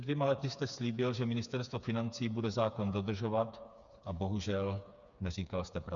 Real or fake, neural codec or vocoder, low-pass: fake; codec, 16 kHz, 4 kbps, FreqCodec, smaller model; 7.2 kHz